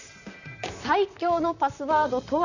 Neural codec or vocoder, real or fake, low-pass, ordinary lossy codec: vocoder, 44.1 kHz, 128 mel bands, Pupu-Vocoder; fake; 7.2 kHz; none